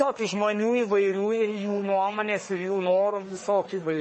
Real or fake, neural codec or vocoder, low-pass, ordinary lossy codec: fake; codec, 44.1 kHz, 1.7 kbps, Pupu-Codec; 10.8 kHz; MP3, 32 kbps